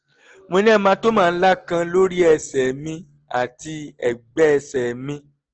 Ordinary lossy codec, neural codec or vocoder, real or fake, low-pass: Opus, 16 kbps; none; real; 7.2 kHz